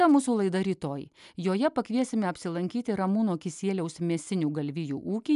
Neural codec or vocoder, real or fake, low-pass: none; real; 10.8 kHz